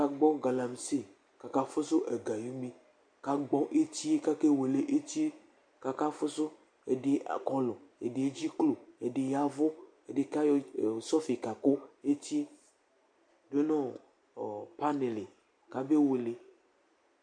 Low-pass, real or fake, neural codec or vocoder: 9.9 kHz; real; none